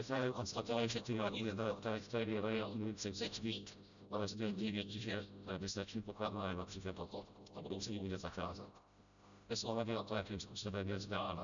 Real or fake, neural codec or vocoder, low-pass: fake; codec, 16 kHz, 0.5 kbps, FreqCodec, smaller model; 7.2 kHz